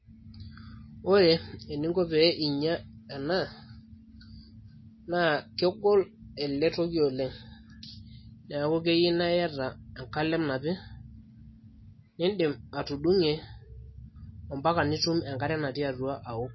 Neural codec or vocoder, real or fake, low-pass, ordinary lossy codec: none; real; 7.2 kHz; MP3, 24 kbps